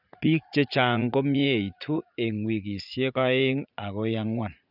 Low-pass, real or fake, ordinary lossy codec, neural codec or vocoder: 5.4 kHz; fake; none; vocoder, 44.1 kHz, 80 mel bands, Vocos